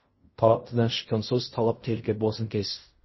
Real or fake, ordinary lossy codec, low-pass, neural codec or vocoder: fake; MP3, 24 kbps; 7.2 kHz; codec, 16 kHz in and 24 kHz out, 0.4 kbps, LongCat-Audio-Codec, fine tuned four codebook decoder